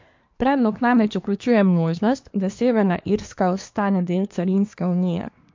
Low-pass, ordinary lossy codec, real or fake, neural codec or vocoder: 7.2 kHz; MP3, 48 kbps; fake; codec, 24 kHz, 1 kbps, SNAC